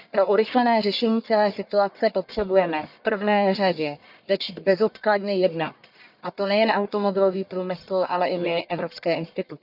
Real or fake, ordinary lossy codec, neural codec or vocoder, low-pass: fake; none; codec, 44.1 kHz, 1.7 kbps, Pupu-Codec; 5.4 kHz